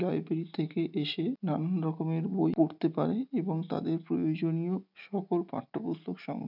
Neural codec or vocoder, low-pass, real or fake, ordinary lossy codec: none; 5.4 kHz; real; none